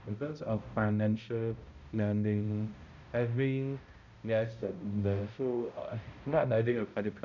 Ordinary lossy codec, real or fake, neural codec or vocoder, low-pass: none; fake; codec, 16 kHz, 0.5 kbps, X-Codec, HuBERT features, trained on balanced general audio; 7.2 kHz